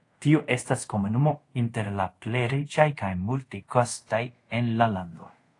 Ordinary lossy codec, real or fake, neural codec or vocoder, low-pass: AAC, 48 kbps; fake; codec, 24 kHz, 0.5 kbps, DualCodec; 10.8 kHz